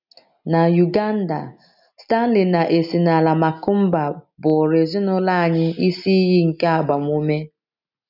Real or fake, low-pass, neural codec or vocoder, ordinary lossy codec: real; 5.4 kHz; none; none